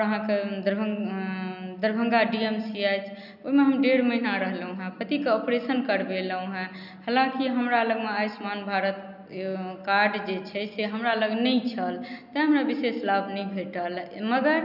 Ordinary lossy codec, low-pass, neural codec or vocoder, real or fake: none; 5.4 kHz; none; real